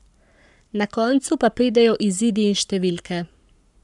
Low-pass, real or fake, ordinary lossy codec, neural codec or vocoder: 10.8 kHz; fake; none; codec, 44.1 kHz, 7.8 kbps, Pupu-Codec